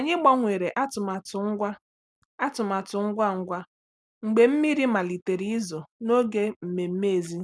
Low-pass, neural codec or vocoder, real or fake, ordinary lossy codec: none; none; real; none